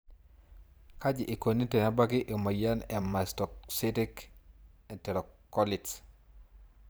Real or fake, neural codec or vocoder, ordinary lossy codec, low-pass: fake; vocoder, 44.1 kHz, 128 mel bands every 512 samples, BigVGAN v2; none; none